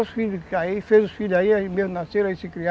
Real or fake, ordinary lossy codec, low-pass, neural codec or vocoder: real; none; none; none